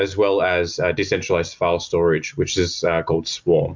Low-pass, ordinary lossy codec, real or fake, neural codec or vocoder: 7.2 kHz; MP3, 64 kbps; real; none